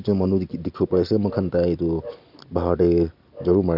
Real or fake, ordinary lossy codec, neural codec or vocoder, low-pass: real; none; none; 5.4 kHz